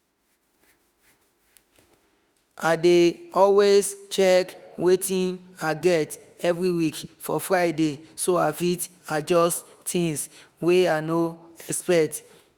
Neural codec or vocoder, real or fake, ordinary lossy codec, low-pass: autoencoder, 48 kHz, 32 numbers a frame, DAC-VAE, trained on Japanese speech; fake; Opus, 64 kbps; 19.8 kHz